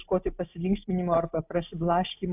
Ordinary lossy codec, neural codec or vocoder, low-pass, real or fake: AAC, 32 kbps; none; 3.6 kHz; real